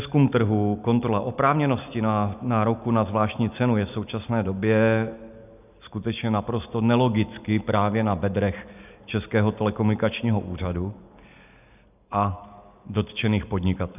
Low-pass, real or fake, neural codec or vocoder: 3.6 kHz; real; none